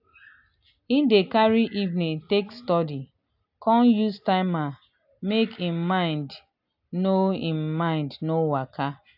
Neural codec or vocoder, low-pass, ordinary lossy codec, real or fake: none; 5.4 kHz; AAC, 48 kbps; real